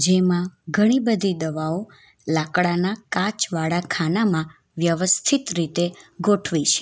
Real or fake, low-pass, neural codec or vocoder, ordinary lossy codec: real; none; none; none